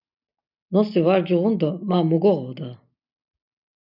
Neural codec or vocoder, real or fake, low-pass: none; real; 5.4 kHz